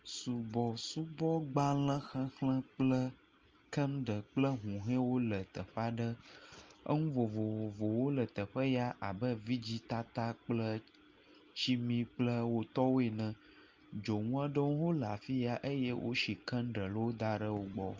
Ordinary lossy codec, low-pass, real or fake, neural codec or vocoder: Opus, 24 kbps; 7.2 kHz; real; none